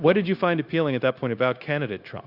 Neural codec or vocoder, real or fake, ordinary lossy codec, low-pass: codec, 16 kHz, 0.9 kbps, LongCat-Audio-Codec; fake; Opus, 64 kbps; 5.4 kHz